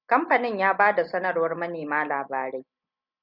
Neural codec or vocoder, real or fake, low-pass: none; real; 5.4 kHz